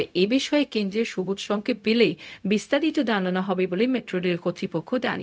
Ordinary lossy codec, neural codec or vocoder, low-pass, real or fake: none; codec, 16 kHz, 0.4 kbps, LongCat-Audio-Codec; none; fake